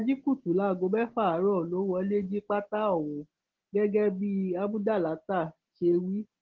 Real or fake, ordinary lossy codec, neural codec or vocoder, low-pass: real; Opus, 16 kbps; none; 7.2 kHz